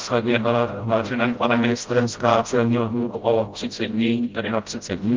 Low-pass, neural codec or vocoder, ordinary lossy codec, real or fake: 7.2 kHz; codec, 16 kHz, 0.5 kbps, FreqCodec, smaller model; Opus, 32 kbps; fake